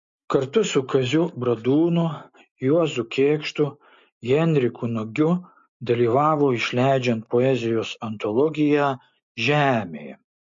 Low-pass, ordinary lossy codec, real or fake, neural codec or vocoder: 7.2 kHz; MP3, 48 kbps; real; none